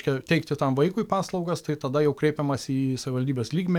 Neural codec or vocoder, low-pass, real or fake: codec, 44.1 kHz, 7.8 kbps, DAC; 19.8 kHz; fake